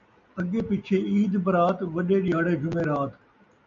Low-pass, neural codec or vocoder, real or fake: 7.2 kHz; none; real